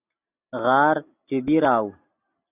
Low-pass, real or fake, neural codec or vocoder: 3.6 kHz; real; none